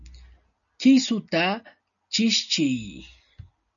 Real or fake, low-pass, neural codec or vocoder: real; 7.2 kHz; none